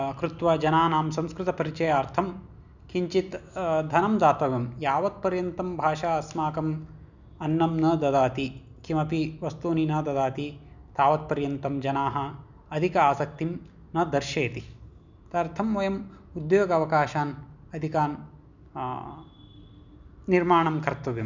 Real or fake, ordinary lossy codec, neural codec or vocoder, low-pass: real; none; none; 7.2 kHz